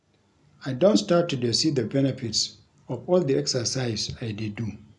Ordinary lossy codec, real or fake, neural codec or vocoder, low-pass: none; real; none; 10.8 kHz